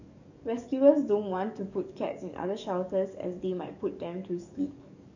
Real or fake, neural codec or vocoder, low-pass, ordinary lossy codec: fake; codec, 44.1 kHz, 7.8 kbps, DAC; 7.2 kHz; none